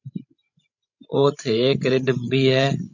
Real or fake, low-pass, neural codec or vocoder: fake; 7.2 kHz; codec, 16 kHz, 16 kbps, FreqCodec, larger model